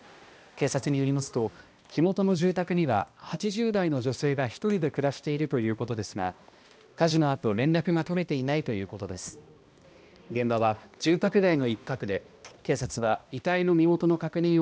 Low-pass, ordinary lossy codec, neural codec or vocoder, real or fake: none; none; codec, 16 kHz, 1 kbps, X-Codec, HuBERT features, trained on balanced general audio; fake